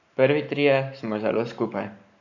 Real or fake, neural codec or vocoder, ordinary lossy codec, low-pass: fake; codec, 44.1 kHz, 7.8 kbps, Pupu-Codec; none; 7.2 kHz